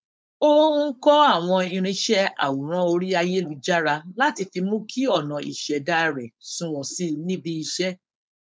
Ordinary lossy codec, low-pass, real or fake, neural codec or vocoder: none; none; fake; codec, 16 kHz, 4.8 kbps, FACodec